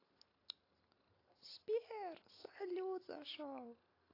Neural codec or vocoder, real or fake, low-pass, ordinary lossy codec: none; real; 5.4 kHz; AAC, 24 kbps